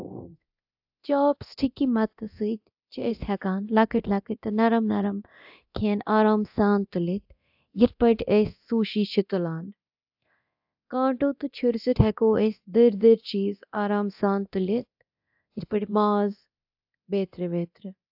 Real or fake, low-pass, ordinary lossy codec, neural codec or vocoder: fake; 5.4 kHz; AAC, 48 kbps; codec, 24 kHz, 0.9 kbps, DualCodec